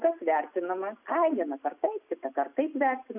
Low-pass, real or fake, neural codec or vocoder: 3.6 kHz; real; none